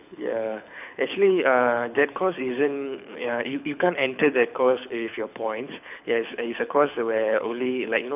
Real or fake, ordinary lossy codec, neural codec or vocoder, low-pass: fake; none; codec, 24 kHz, 6 kbps, HILCodec; 3.6 kHz